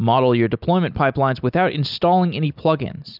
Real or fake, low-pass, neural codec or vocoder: real; 5.4 kHz; none